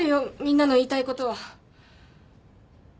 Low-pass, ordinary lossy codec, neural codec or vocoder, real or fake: none; none; none; real